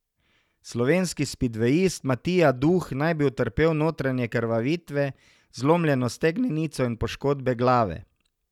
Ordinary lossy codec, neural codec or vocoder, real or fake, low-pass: none; none; real; 19.8 kHz